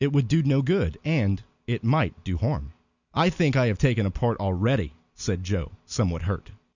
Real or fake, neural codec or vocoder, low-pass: real; none; 7.2 kHz